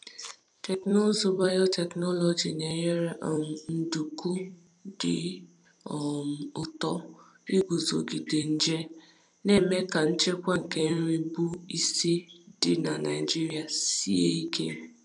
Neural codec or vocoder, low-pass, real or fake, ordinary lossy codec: vocoder, 44.1 kHz, 128 mel bands every 256 samples, BigVGAN v2; 10.8 kHz; fake; none